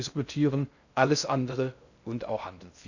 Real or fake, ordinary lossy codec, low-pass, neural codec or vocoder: fake; none; 7.2 kHz; codec, 16 kHz in and 24 kHz out, 0.6 kbps, FocalCodec, streaming, 2048 codes